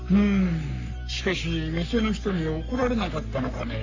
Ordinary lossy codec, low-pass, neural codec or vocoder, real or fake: AAC, 48 kbps; 7.2 kHz; codec, 44.1 kHz, 3.4 kbps, Pupu-Codec; fake